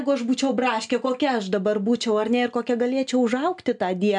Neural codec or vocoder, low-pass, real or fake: none; 9.9 kHz; real